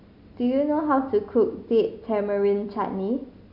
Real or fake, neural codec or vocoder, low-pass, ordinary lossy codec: real; none; 5.4 kHz; none